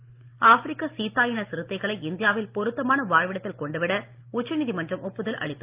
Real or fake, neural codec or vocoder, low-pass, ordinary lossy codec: real; none; 3.6 kHz; Opus, 32 kbps